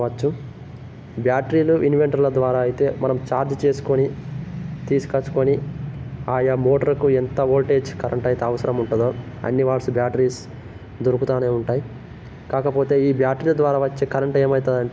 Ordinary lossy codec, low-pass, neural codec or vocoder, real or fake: none; none; none; real